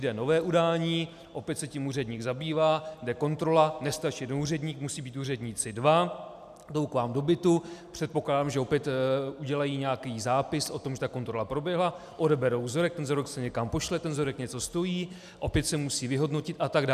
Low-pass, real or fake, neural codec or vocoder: 14.4 kHz; real; none